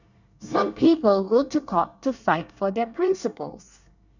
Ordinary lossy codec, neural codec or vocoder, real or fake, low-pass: none; codec, 24 kHz, 1 kbps, SNAC; fake; 7.2 kHz